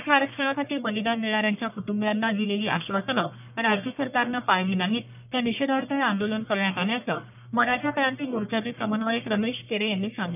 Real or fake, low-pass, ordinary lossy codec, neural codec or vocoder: fake; 3.6 kHz; none; codec, 44.1 kHz, 1.7 kbps, Pupu-Codec